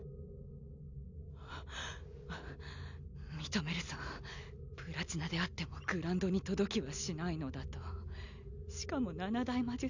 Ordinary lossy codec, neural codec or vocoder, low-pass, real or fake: none; none; 7.2 kHz; real